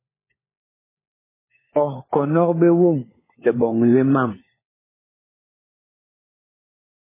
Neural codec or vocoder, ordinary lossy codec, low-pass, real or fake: codec, 16 kHz, 4 kbps, FunCodec, trained on LibriTTS, 50 frames a second; MP3, 16 kbps; 3.6 kHz; fake